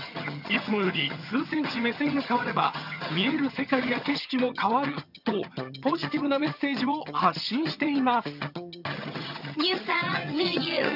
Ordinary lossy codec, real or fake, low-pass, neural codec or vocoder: none; fake; 5.4 kHz; vocoder, 22.05 kHz, 80 mel bands, HiFi-GAN